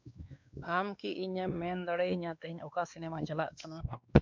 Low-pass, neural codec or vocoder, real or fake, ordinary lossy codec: 7.2 kHz; codec, 16 kHz, 2 kbps, X-Codec, WavLM features, trained on Multilingual LibriSpeech; fake; MP3, 64 kbps